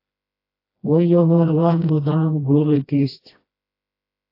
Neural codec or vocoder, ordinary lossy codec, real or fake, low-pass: codec, 16 kHz, 1 kbps, FreqCodec, smaller model; AAC, 32 kbps; fake; 5.4 kHz